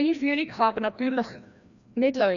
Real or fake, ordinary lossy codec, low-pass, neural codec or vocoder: fake; none; 7.2 kHz; codec, 16 kHz, 1 kbps, FreqCodec, larger model